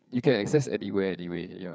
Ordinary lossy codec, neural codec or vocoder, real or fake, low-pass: none; codec, 16 kHz, 4 kbps, FreqCodec, larger model; fake; none